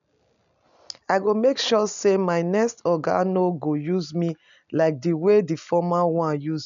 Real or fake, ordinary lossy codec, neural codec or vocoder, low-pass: real; none; none; 7.2 kHz